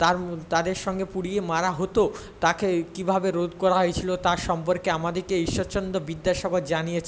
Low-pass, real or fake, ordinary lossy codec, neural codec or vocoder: none; real; none; none